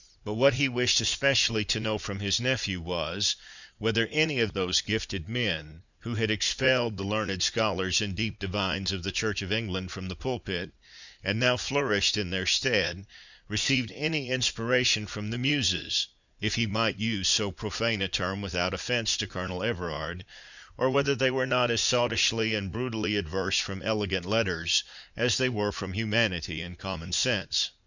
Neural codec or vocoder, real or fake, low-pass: vocoder, 44.1 kHz, 80 mel bands, Vocos; fake; 7.2 kHz